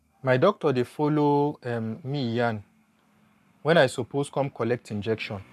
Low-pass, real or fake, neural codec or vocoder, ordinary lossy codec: 14.4 kHz; fake; codec, 44.1 kHz, 7.8 kbps, Pupu-Codec; none